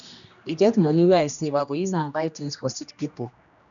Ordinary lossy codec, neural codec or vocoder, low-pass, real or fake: none; codec, 16 kHz, 1 kbps, X-Codec, HuBERT features, trained on general audio; 7.2 kHz; fake